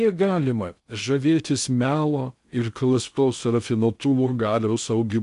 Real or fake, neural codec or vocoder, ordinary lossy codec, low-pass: fake; codec, 16 kHz in and 24 kHz out, 0.6 kbps, FocalCodec, streaming, 2048 codes; AAC, 96 kbps; 10.8 kHz